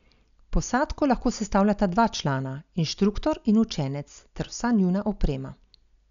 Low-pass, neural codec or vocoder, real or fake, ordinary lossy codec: 7.2 kHz; none; real; none